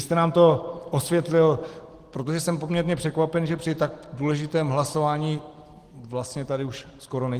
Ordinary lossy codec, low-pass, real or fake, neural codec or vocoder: Opus, 16 kbps; 14.4 kHz; real; none